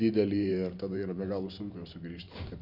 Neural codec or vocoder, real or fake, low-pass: vocoder, 44.1 kHz, 128 mel bands every 512 samples, BigVGAN v2; fake; 5.4 kHz